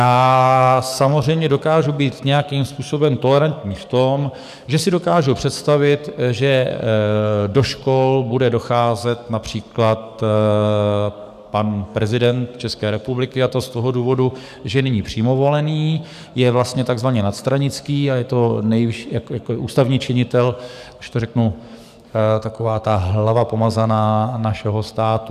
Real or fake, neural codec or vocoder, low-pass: fake; codec, 44.1 kHz, 7.8 kbps, DAC; 14.4 kHz